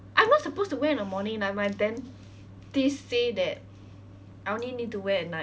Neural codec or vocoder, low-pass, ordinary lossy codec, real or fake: none; none; none; real